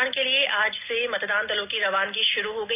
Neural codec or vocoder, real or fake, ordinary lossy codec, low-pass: none; real; none; 3.6 kHz